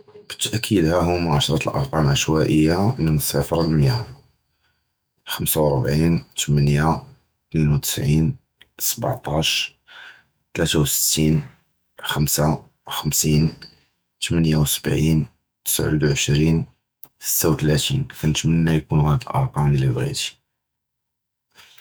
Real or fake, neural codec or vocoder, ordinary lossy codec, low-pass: fake; autoencoder, 48 kHz, 128 numbers a frame, DAC-VAE, trained on Japanese speech; none; none